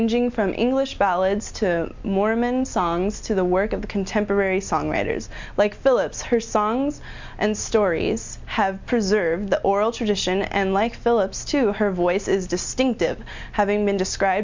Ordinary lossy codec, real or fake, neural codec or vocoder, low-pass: MP3, 64 kbps; real; none; 7.2 kHz